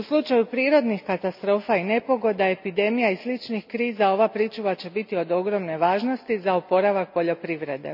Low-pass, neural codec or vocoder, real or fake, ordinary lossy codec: 5.4 kHz; none; real; none